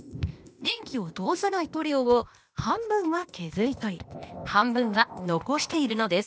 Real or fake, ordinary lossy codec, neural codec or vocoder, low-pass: fake; none; codec, 16 kHz, 0.8 kbps, ZipCodec; none